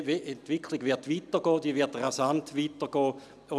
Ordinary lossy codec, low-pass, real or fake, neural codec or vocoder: none; none; real; none